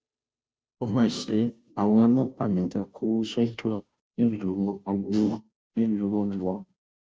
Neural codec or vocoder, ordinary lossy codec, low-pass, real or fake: codec, 16 kHz, 0.5 kbps, FunCodec, trained on Chinese and English, 25 frames a second; none; none; fake